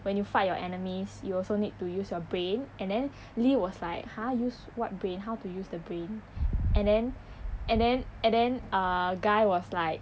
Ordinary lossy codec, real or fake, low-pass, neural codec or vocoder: none; real; none; none